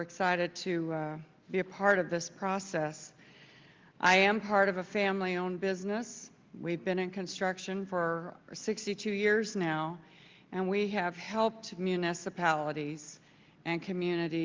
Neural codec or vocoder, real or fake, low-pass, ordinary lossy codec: none; real; 7.2 kHz; Opus, 32 kbps